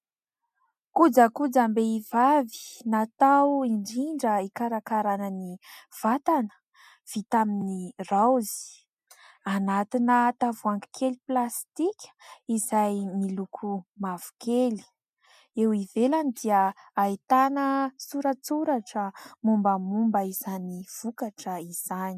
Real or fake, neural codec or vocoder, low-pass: real; none; 14.4 kHz